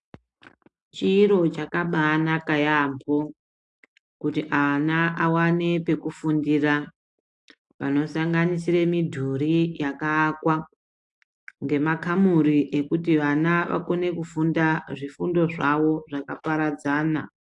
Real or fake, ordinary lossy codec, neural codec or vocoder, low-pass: real; AAC, 64 kbps; none; 10.8 kHz